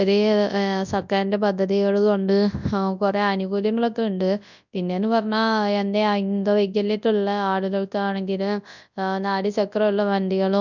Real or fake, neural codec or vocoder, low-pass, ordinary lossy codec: fake; codec, 24 kHz, 0.9 kbps, WavTokenizer, large speech release; 7.2 kHz; none